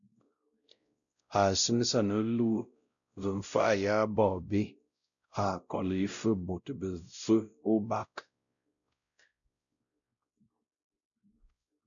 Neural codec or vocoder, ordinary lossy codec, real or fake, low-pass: codec, 16 kHz, 0.5 kbps, X-Codec, WavLM features, trained on Multilingual LibriSpeech; MP3, 96 kbps; fake; 7.2 kHz